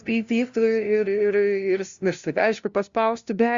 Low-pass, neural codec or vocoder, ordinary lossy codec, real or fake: 7.2 kHz; codec, 16 kHz, 0.5 kbps, FunCodec, trained on LibriTTS, 25 frames a second; Opus, 64 kbps; fake